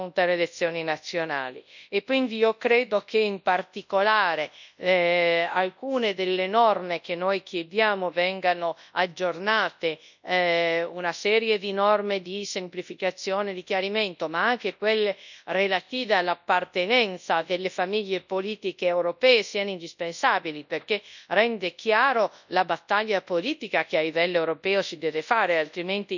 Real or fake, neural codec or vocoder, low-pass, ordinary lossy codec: fake; codec, 24 kHz, 0.9 kbps, WavTokenizer, large speech release; 7.2 kHz; none